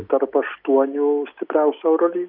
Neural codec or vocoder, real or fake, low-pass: none; real; 5.4 kHz